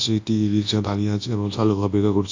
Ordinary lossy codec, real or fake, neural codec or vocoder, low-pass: AAC, 48 kbps; fake; codec, 24 kHz, 0.9 kbps, WavTokenizer, large speech release; 7.2 kHz